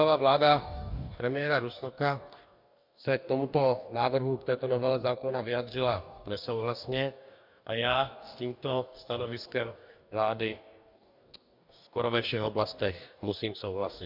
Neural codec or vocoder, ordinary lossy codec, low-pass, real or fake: codec, 44.1 kHz, 2.6 kbps, DAC; MP3, 48 kbps; 5.4 kHz; fake